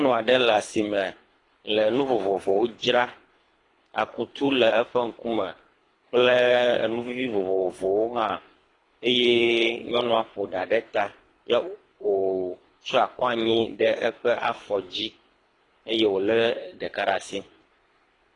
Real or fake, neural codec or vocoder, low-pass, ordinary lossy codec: fake; codec, 24 kHz, 3 kbps, HILCodec; 10.8 kHz; AAC, 32 kbps